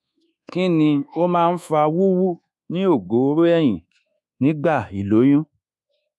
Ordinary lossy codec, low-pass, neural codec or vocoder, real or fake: none; none; codec, 24 kHz, 1.2 kbps, DualCodec; fake